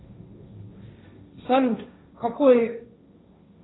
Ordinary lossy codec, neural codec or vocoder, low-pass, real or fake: AAC, 16 kbps; codec, 16 kHz, 1.1 kbps, Voila-Tokenizer; 7.2 kHz; fake